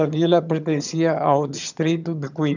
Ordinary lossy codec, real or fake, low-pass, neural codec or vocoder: none; fake; 7.2 kHz; vocoder, 22.05 kHz, 80 mel bands, HiFi-GAN